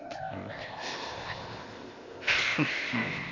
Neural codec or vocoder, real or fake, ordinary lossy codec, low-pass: codec, 16 kHz, 0.8 kbps, ZipCodec; fake; AAC, 32 kbps; 7.2 kHz